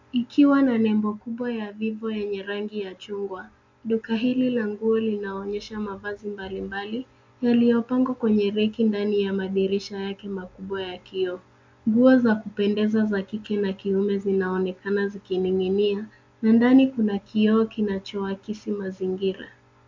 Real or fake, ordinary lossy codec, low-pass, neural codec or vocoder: real; MP3, 64 kbps; 7.2 kHz; none